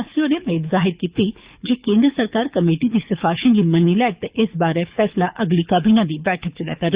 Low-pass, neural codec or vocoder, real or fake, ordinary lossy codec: 3.6 kHz; codec, 24 kHz, 6 kbps, HILCodec; fake; Opus, 64 kbps